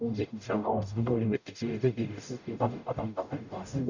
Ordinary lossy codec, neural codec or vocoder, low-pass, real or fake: none; codec, 44.1 kHz, 0.9 kbps, DAC; 7.2 kHz; fake